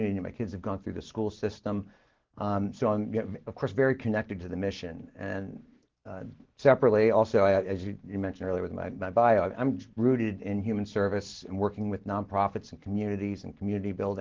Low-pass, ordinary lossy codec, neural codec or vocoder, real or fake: 7.2 kHz; Opus, 16 kbps; none; real